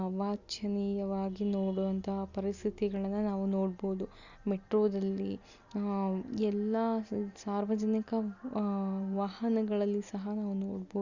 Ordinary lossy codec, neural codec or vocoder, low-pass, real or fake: Opus, 64 kbps; none; 7.2 kHz; real